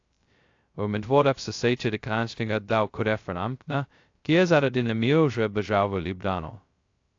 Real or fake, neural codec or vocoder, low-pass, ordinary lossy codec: fake; codec, 16 kHz, 0.2 kbps, FocalCodec; 7.2 kHz; AAC, 48 kbps